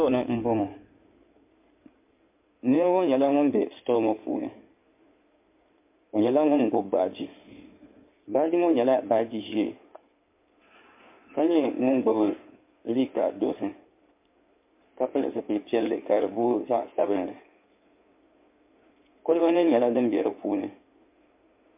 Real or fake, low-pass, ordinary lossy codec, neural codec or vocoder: fake; 3.6 kHz; MP3, 32 kbps; codec, 16 kHz in and 24 kHz out, 2.2 kbps, FireRedTTS-2 codec